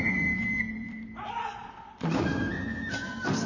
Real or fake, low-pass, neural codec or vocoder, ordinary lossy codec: fake; 7.2 kHz; vocoder, 44.1 kHz, 128 mel bands, Pupu-Vocoder; none